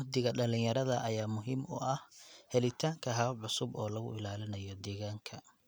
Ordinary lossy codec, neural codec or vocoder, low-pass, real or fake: none; none; none; real